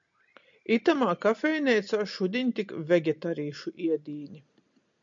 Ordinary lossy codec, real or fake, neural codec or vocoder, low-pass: MP3, 64 kbps; real; none; 7.2 kHz